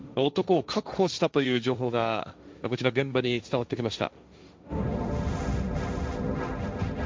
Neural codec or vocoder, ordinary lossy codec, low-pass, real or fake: codec, 16 kHz, 1.1 kbps, Voila-Tokenizer; none; none; fake